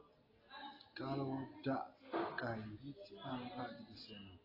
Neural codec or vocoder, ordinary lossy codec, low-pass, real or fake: none; AAC, 24 kbps; 5.4 kHz; real